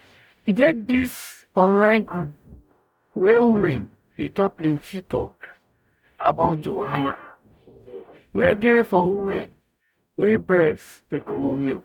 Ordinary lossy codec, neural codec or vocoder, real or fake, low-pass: none; codec, 44.1 kHz, 0.9 kbps, DAC; fake; 19.8 kHz